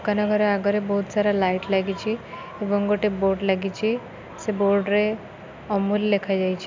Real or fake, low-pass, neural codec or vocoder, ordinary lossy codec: real; 7.2 kHz; none; MP3, 64 kbps